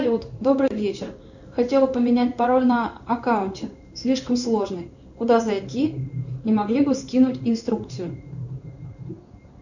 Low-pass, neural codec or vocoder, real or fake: 7.2 kHz; codec, 16 kHz in and 24 kHz out, 1 kbps, XY-Tokenizer; fake